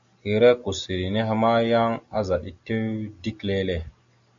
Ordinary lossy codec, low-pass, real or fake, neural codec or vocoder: AAC, 64 kbps; 7.2 kHz; real; none